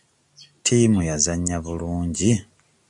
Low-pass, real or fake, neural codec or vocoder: 10.8 kHz; real; none